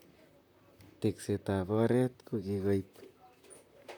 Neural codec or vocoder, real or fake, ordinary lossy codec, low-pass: vocoder, 44.1 kHz, 128 mel bands, Pupu-Vocoder; fake; none; none